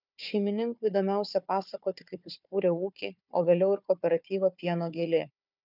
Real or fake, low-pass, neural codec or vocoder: fake; 5.4 kHz; codec, 16 kHz, 4 kbps, FunCodec, trained on Chinese and English, 50 frames a second